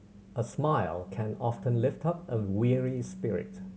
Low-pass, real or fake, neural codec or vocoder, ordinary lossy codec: none; real; none; none